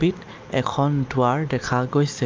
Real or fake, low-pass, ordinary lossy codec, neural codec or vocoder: real; 7.2 kHz; Opus, 24 kbps; none